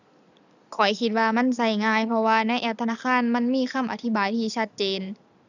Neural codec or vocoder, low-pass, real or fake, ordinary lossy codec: none; 7.2 kHz; real; none